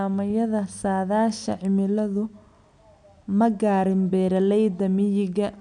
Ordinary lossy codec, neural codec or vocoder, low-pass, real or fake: none; none; 9.9 kHz; real